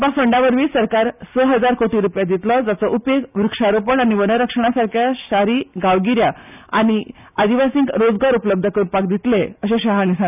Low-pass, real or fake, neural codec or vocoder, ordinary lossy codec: 3.6 kHz; real; none; none